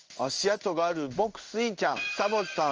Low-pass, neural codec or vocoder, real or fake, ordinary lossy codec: 7.2 kHz; codec, 16 kHz in and 24 kHz out, 1 kbps, XY-Tokenizer; fake; Opus, 24 kbps